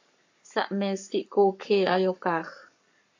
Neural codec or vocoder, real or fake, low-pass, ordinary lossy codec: codec, 44.1 kHz, 7.8 kbps, Pupu-Codec; fake; 7.2 kHz; AAC, 48 kbps